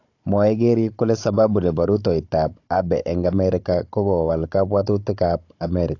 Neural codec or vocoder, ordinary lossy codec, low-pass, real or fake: codec, 16 kHz, 16 kbps, FunCodec, trained on Chinese and English, 50 frames a second; AAC, 48 kbps; 7.2 kHz; fake